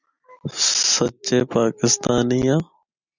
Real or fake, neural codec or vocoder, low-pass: real; none; 7.2 kHz